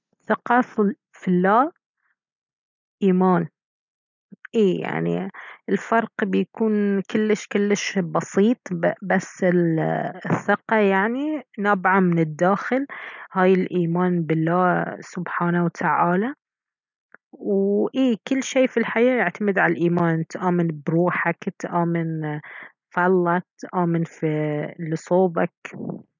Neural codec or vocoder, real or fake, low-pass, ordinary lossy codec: none; real; 7.2 kHz; none